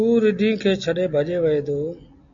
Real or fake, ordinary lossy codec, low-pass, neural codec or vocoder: real; AAC, 64 kbps; 7.2 kHz; none